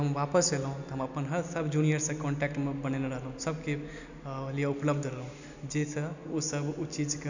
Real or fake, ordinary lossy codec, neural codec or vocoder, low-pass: real; none; none; 7.2 kHz